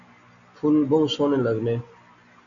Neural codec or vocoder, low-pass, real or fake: none; 7.2 kHz; real